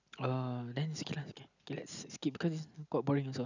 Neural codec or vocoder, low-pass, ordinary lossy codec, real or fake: none; 7.2 kHz; none; real